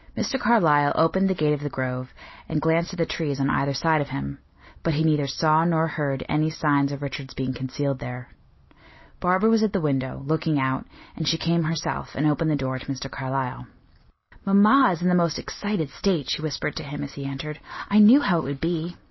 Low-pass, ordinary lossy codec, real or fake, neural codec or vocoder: 7.2 kHz; MP3, 24 kbps; real; none